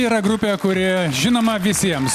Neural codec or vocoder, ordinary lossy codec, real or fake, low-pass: none; Opus, 64 kbps; real; 14.4 kHz